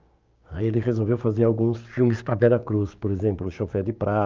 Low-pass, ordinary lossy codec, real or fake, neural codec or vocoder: 7.2 kHz; Opus, 24 kbps; fake; codec, 16 kHz, 6 kbps, DAC